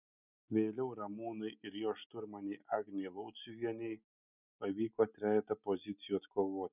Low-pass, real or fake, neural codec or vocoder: 3.6 kHz; real; none